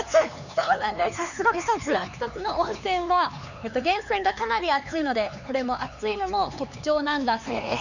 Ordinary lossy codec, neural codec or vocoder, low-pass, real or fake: none; codec, 16 kHz, 4 kbps, X-Codec, HuBERT features, trained on LibriSpeech; 7.2 kHz; fake